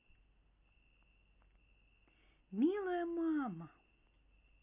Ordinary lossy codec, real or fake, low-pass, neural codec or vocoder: none; real; 3.6 kHz; none